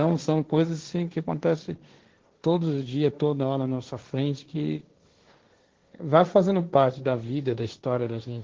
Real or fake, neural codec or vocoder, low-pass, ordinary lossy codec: fake; codec, 16 kHz, 1.1 kbps, Voila-Tokenizer; 7.2 kHz; Opus, 16 kbps